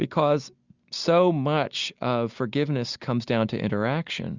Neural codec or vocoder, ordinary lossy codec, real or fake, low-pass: none; Opus, 64 kbps; real; 7.2 kHz